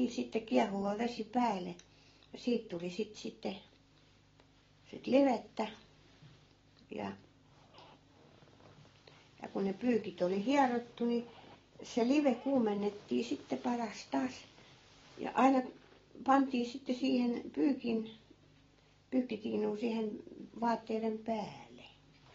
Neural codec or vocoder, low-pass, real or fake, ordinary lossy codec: none; 7.2 kHz; real; AAC, 24 kbps